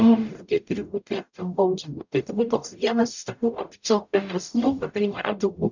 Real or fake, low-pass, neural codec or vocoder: fake; 7.2 kHz; codec, 44.1 kHz, 0.9 kbps, DAC